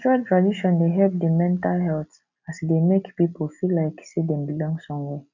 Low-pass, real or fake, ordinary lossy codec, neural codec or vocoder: 7.2 kHz; real; none; none